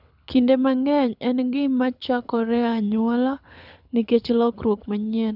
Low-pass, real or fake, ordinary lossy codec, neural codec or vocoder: 5.4 kHz; fake; none; codec, 24 kHz, 6 kbps, HILCodec